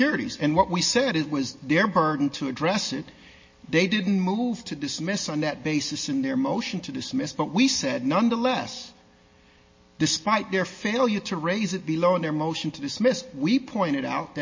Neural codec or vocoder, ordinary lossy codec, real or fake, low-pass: none; MP3, 32 kbps; real; 7.2 kHz